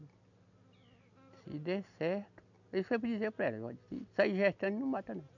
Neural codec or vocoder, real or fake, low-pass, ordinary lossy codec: none; real; 7.2 kHz; none